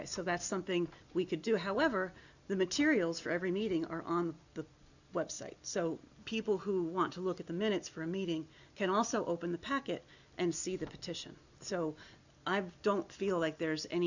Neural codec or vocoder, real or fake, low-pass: none; real; 7.2 kHz